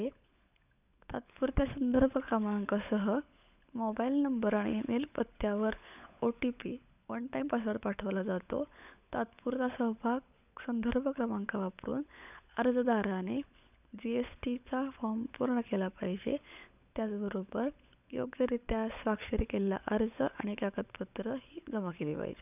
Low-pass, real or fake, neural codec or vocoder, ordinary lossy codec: 3.6 kHz; fake; codec, 16 kHz, 16 kbps, FunCodec, trained on LibriTTS, 50 frames a second; none